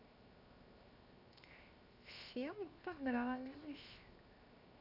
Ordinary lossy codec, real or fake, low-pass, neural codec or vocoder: none; fake; 5.4 kHz; codec, 16 kHz, 0.7 kbps, FocalCodec